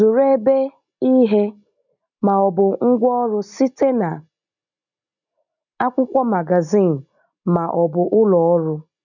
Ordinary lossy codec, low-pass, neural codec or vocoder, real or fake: none; 7.2 kHz; none; real